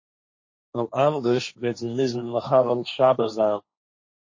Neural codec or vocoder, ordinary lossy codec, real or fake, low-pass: codec, 16 kHz, 1.1 kbps, Voila-Tokenizer; MP3, 32 kbps; fake; 7.2 kHz